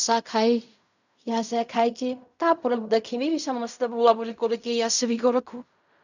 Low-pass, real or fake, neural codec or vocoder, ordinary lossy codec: 7.2 kHz; fake; codec, 16 kHz in and 24 kHz out, 0.4 kbps, LongCat-Audio-Codec, fine tuned four codebook decoder; none